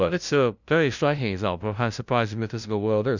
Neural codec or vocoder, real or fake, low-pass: codec, 16 kHz, 0.5 kbps, FunCodec, trained on LibriTTS, 25 frames a second; fake; 7.2 kHz